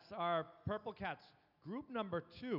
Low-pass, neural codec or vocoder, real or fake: 5.4 kHz; none; real